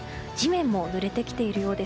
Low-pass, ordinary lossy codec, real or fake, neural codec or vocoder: none; none; real; none